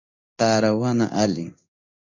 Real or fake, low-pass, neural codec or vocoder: fake; 7.2 kHz; codec, 16 kHz in and 24 kHz out, 1 kbps, XY-Tokenizer